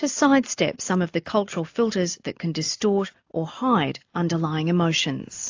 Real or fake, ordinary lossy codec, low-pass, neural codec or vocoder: real; AAC, 48 kbps; 7.2 kHz; none